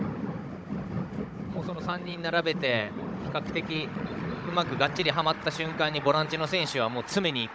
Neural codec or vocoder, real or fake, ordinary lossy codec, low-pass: codec, 16 kHz, 16 kbps, FunCodec, trained on Chinese and English, 50 frames a second; fake; none; none